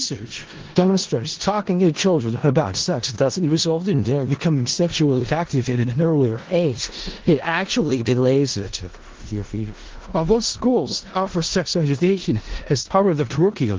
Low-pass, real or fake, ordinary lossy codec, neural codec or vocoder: 7.2 kHz; fake; Opus, 16 kbps; codec, 16 kHz in and 24 kHz out, 0.4 kbps, LongCat-Audio-Codec, four codebook decoder